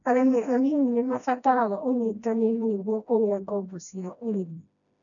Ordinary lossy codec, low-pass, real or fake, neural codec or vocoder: none; 7.2 kHz; fake; codec, 16 kHz, 1 kbps, FreqCodec, smaller model